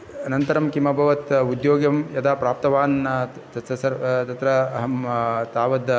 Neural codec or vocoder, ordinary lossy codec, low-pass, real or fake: none; none; none; real